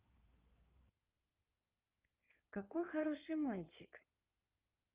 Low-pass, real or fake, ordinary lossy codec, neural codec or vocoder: 3.6 kHz; fake; Opus, 32 kbps; codec, 16 kHz in and 24 kHz out, 2.2 kbps, FireRedTTS-2 codec